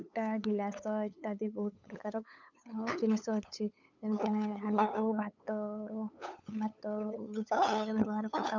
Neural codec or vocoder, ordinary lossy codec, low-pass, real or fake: codec, 16 kHz, 8 kbps, FunCodec, trained on LibriTTS, 25 frames a second; none; 7.2 kHz; fake